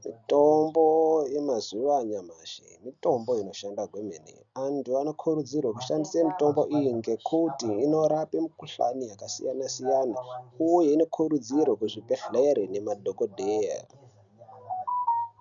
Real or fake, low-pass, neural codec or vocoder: real; 7.2 kHz; none